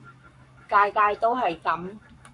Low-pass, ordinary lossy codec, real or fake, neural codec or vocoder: 10.8 kHz; AAC, 64 kbps; fake; codec, 44.1 kHz, 7.8 kbps, Pupu-Codec